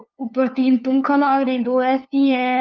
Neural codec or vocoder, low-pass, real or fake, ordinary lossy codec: codec, 16 kHz, 2 kbps, FunCodec, trained on LibriTTS, 25 frames a second; 7.2 kHz; fake; Opus, 24 kbps